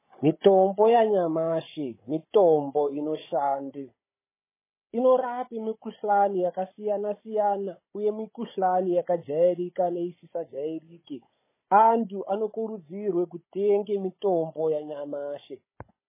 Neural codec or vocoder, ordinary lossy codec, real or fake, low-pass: codec, 16 kHz, 16 kbps, FunCodec, trained on Chinese and English, 50 frames a second; MP3, 16 kbps; fake; 3.6 kHz